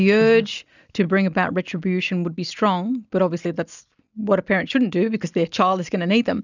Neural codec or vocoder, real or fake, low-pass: none; real; 7.2 kHz